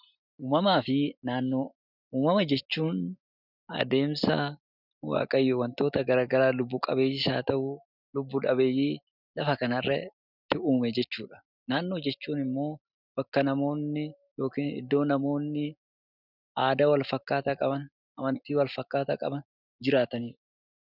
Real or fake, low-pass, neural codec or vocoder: real; 5.4 kHz; none